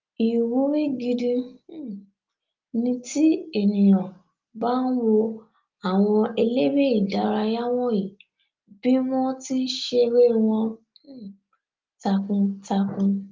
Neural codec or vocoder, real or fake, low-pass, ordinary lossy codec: none; real; 7.2 kHz; Opus, 32 kbps